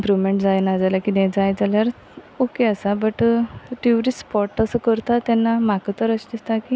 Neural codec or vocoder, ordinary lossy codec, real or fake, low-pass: none; none; real; none